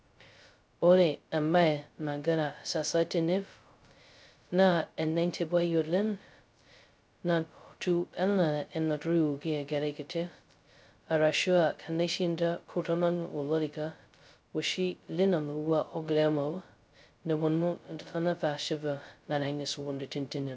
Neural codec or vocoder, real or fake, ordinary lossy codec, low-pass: codec, 16 kHz, 0.2 kbps, FocalCodec; fake; none; none